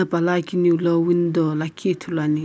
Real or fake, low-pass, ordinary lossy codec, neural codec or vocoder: real; none; none; none